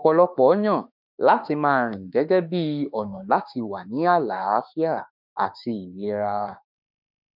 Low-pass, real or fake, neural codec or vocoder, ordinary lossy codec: 5.4 kHz; fake; autoencoder, 48 kHz, 32 numbers a frame, DAC-VAE, trained on Japanese speech; none